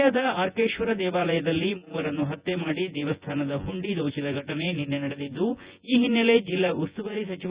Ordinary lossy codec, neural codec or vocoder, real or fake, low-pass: Opus, 64 kbps; vocoder, 24 kHz, 100 mel bands, Vocos; fake; 3.6 kHz